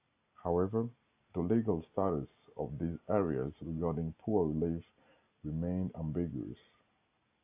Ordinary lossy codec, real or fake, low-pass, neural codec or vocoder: AAC, 32 kbps; real; 3.6 kHz; none